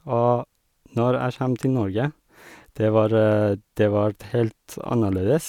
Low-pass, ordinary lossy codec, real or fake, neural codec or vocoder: 19.8 kHz; none; real; none